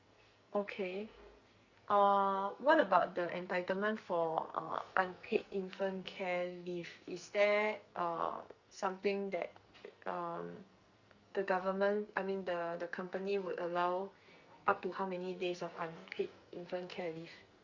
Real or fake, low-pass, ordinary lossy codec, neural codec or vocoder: fake; 7.2 kHz; Opus, 64 kbps; codec, 44.1 kHz, 2.6 kbps, SNAC